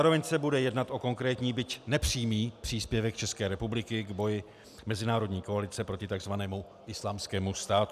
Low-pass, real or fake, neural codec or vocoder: 14.4 kHz; real; none